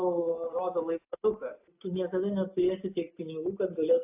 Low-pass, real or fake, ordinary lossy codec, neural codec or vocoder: 3.6 kHz; real; AAC, 32 kbps; none